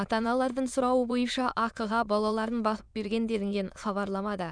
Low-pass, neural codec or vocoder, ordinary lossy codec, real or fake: 9.9 kHz; autoencoder, 22.05 kHz, a latent of 192 numbers a frame, VITS, trained on many speakers; none; fake